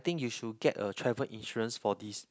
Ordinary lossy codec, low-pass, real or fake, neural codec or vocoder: none; none; real; none